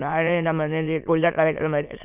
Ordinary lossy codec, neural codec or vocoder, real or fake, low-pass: none; autoencoder, 22.05 kHz, a latent of 192 numbers a frame, VITS, trained on many speakers; fake; 3.6 kHz